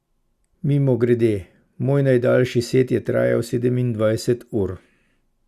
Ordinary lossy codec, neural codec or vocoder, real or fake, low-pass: Opus, 64 kbps; none; real; 14.4 kHz